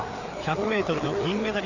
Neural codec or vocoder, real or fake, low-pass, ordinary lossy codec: codec, 16 kHz, 4 kbps, FreqCodec, larger model; fake; 7.2 kHz; none